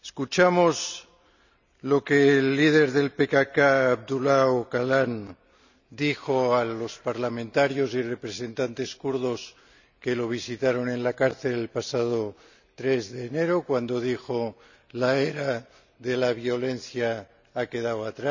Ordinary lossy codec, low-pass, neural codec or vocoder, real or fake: none; 7.2 kHz; none; real